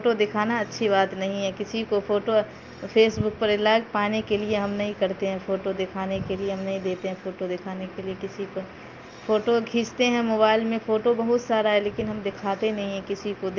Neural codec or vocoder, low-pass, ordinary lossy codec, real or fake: none; 7.2 kHz; Opus, 32 kbps; real